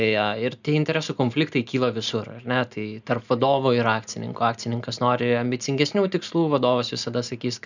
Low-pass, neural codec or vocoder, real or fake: 7.2 kHz; none; real